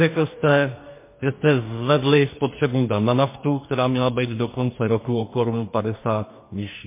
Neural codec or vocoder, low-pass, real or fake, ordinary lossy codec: codec, 44.1 kHz, 2.6 kbps, DAC; 3.6 kHz; fake; MP3, 24 kbps